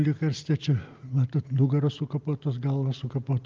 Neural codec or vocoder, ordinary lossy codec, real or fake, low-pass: none; Opus, 32 kbps; real; 7.2 kHz